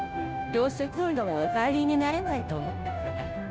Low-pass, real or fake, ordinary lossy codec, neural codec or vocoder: none; fake; none; codec, 16 kHz, 0.5 kbps, FunCodec, trained on Chinese and English, 25 frames a second